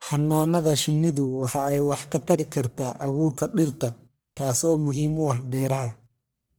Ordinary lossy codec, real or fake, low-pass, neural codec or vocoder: none; fake; none; codec, 44.1 kHz, 1.7 kbps, Pupu-Codec